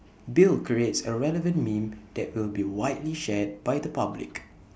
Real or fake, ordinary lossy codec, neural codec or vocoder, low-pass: real; none; none; none